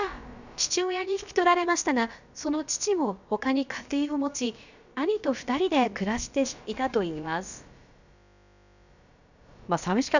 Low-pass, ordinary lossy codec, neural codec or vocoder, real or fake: 7.2 kHz; none; codec, 16 kHz, about 1 kbps, DyCAST, with the encoder's durations; fake